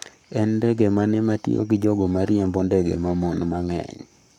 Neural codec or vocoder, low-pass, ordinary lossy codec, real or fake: codec, 44.1 kHz, 7.8 kbps, Pupu-Codec; 19.8 kHz; none; fake